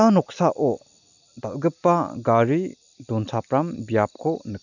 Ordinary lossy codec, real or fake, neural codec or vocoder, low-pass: none; real; none; 7.2 kHz